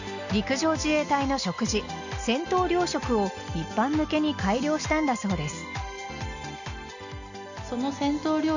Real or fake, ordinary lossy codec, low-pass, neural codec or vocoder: real; none; 7.2 kHz; none